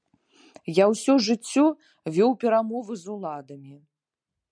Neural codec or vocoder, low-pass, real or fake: none; 9.9 kHz; real